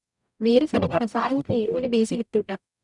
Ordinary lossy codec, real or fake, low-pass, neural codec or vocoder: none; fake; 10.8 kHz; codec, 44.1 kHz, 0.9 kbps, DAC